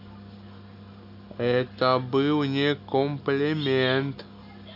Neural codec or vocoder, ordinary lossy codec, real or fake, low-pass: none; AAC, 48 kbps; real; 5.4 kHz